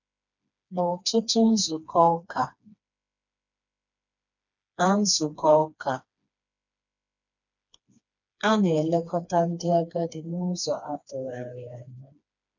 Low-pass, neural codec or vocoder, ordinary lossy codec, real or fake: 7.2 kHz; codec, 16 kHz, 2 kbps, FreqCodec, smaller model; none; fake